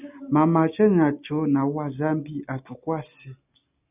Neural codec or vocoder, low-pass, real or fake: none; 3.6 kHz; real